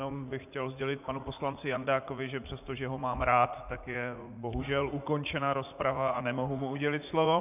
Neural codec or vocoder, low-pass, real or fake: vocoder, 44.1 kHz, 80 mel bands, Vocos; 3.6 kHz; fake